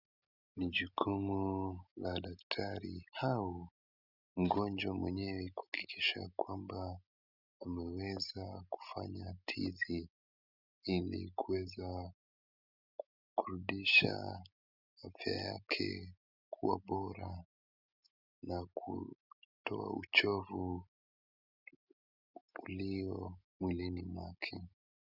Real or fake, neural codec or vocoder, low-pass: real; none; 5.4 kHz